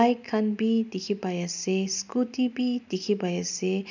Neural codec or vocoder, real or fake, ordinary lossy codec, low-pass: none; real; none; 7.2 kHz